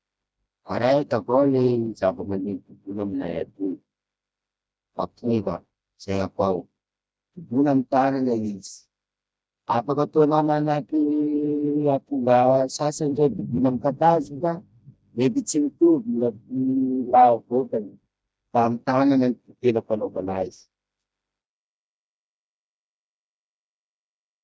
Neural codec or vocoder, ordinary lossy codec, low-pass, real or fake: codec, 16 kHz, 1 kbps, FreqCodec, smaller model; none; none; fake